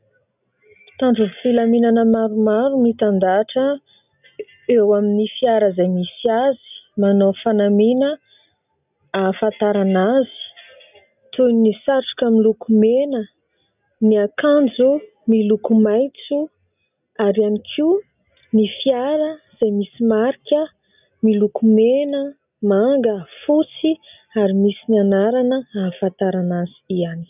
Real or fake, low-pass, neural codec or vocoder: real; 3.6 kHz; none